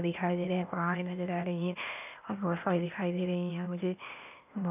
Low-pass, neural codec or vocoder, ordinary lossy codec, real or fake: 3.6 kHz; codec, 16 kHz, 0.8 kbps, ZipCodec; none; fake